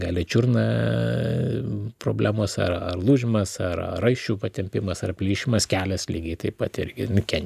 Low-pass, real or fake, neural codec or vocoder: 14.4 kHz; real; none